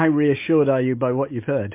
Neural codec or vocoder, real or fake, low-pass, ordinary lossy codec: none; real; 3.6 kHz; MP3, 24 kbps